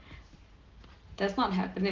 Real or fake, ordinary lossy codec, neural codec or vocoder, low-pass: real; Opus, 16 kbps; none; 7.2 kHz